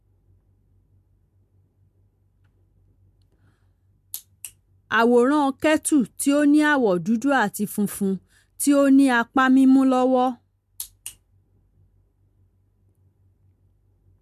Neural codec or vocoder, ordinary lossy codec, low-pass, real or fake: none; MP3, 64 kbps; 14.4 kHz; real